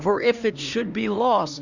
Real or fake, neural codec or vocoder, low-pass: fake; codec, 16 kHz, 2 kbps, X-Codec, HuBERT features, trained on LibriSpeech; 7.2 kHz